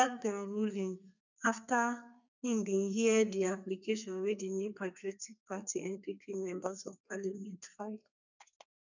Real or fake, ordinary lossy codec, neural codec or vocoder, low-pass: fake; none; codec, 32 kHz, 1.9 kbps, SNAC; 7.2 kHz